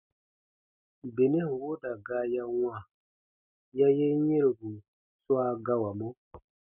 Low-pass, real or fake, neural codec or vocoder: 3.6 kHz; real; none